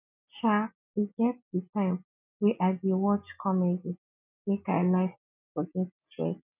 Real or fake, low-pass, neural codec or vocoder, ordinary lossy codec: real; 3.6 kHz; none; none